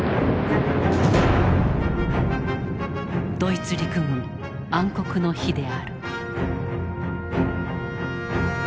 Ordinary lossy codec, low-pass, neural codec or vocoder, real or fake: none; none; none; real